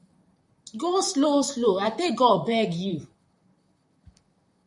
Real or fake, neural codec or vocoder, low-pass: fake; vocoder, 44.1 kHz, 128 mel bands, Pupu-Vocoder; 10.8 kHz